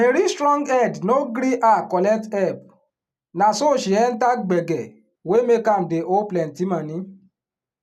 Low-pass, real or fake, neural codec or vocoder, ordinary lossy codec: 14.4 kHz; real; none; none